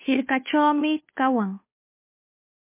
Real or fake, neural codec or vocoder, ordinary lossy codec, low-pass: fake; codec, 16 kHz, 2 kbps, FunCodec, trained on Chinese and English, 25 frames a second; MP3, 24 kbps; 3.6 kHz